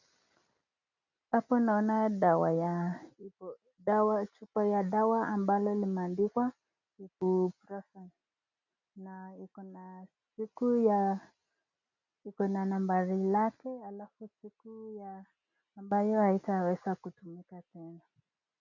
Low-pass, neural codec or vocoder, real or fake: 7.2 kHz; none; real